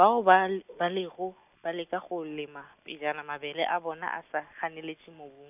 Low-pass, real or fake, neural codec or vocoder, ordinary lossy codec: 3.6 kHz; real; none; none